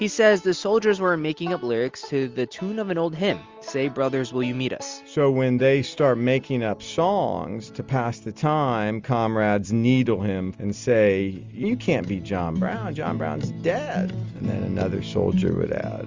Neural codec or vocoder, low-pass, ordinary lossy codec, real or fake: none; 7.2 kHz; Opus, 32 kbps; real